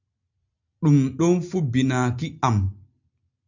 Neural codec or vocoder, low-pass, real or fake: none; 7.2 kHz; real